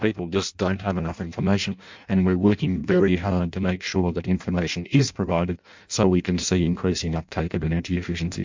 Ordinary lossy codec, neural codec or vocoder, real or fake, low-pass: MP3, 64 kbps; codec, 16 kHz in and 24 kHz out, 0.6 kbps, FireRedTTS-2 codec; fake; 7.2 kHz